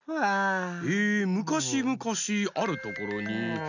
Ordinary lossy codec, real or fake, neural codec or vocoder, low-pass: none; real; none; 7.2 kHz